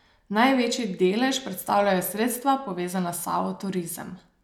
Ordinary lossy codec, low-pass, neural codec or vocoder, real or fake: none; 19.8 kHz; none; real